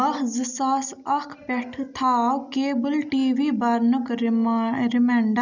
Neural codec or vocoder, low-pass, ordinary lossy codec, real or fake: none; 7.2 kHz; none; real